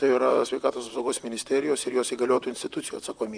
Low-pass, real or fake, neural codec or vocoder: 9.9 kHz; fake; vocoder, 44.1 kHz, 128 mel bands, Pupu-Vocoder